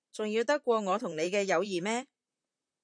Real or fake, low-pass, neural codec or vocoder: fake; 9.9 kHz; vocoder, 44.1 kHz, 128 mel bands, Pupu-Vocoder